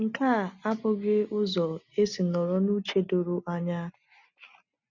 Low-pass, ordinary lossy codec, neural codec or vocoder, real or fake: 7.2 kHz; none; none; real